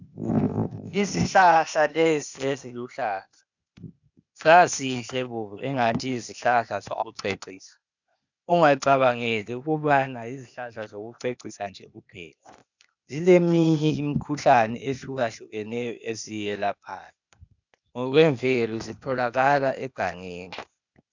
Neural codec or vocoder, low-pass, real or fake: codec, 16 kHz, 0.8 kbps, ZipCodec; 7.2 kHz; fake